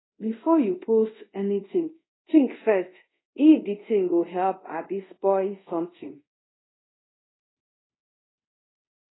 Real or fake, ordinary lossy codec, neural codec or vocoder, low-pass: fake; AAC, 16 kbps; codec, 24 kHz, 0.5 kbps, DualCodec; 7.2 kHz